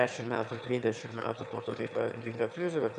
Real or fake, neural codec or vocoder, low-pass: fake; autoencoder, 22.05 kHz, a latent of 192 numbers a frame, VITS, trained on one speaker; 9.9 kHz